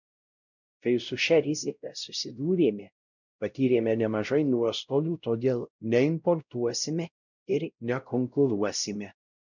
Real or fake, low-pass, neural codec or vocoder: fake; 7.2 kHz; codec, 16 kHz, 0.5 kbps, X-Codec, WavLM features, trained on Multilingual LibriSpeech